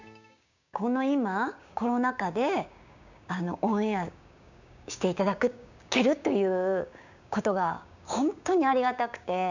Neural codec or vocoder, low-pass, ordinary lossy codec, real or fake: codec, 16 kHz, 6 kbps, DAC; 7.2 kHz; none; fake